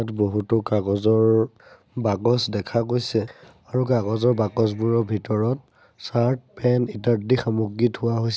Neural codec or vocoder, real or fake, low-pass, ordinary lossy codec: none; real; none; none